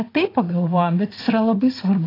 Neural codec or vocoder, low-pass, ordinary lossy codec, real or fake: vocoder, 44.1 kHz, 80 mel bands, Vocos; 5.4 kHz; AAC, 24 kbps; fake